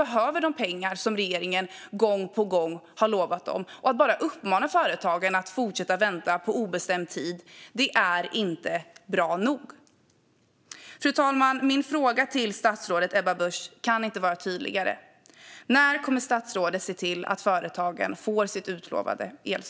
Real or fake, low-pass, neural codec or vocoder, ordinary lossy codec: real; none; none; none